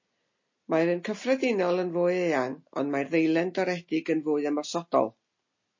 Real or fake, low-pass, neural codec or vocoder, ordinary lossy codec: real; 7.2 kHz; none; MP3, 32 kbps